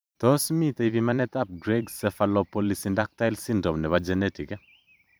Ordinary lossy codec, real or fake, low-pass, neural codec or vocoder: none; fake; none; vocoder, 44.1 kHz, 128 mel bands every 512 samples, BigVGAN v2